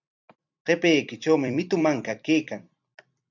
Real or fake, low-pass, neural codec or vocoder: fake; 7.2 kHz; vocoder, 44.1 kHz, 128 mel bands every 256 samples, BigVGAN v2